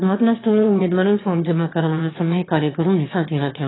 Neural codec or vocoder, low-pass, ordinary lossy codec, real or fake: autoencoder, 22.05 kHz, a latent of 192 numbers a frame, VITS, trained on one speaker; 7.2 kHz; AAC, 16 kbps; fake